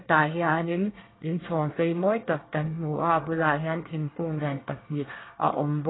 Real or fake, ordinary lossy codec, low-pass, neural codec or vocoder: fake; AAC, 16 kbps; 7.2 kHz; codec, 24 kHz, 1 kbps, SNAC